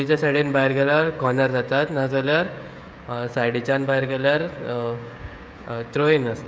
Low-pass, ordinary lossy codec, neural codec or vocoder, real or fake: none; none; codec, 16 kHz, 16 kbps, FreqCodec, smaller model; fake